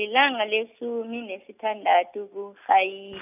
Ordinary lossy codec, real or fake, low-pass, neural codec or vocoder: none; real; 3.6 kHz; none